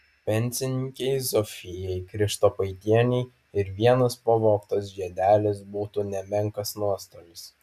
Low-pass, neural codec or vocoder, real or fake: 14.4 kHz; none; real